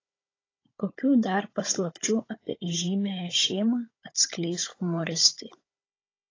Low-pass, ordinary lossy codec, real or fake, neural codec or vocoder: 7.2 kHz; AAC, 32 kbps; fake; codec, 16 kHz, 16 kbps, FunCodec, trained on Chinese and English, 50 frames a second